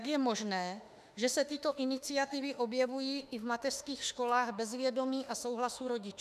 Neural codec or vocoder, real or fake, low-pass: autoencoder, 48 kHz, 32 numbers a frame, DAC-VAE, trained on Japanese speech; fake; 14.4 kHz